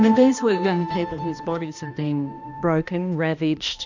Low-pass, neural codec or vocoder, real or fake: 7.2 kHz; codec, 16 kHz, 1 kbps, X-Codec, HuBERT features, trained on balanced general audio; fake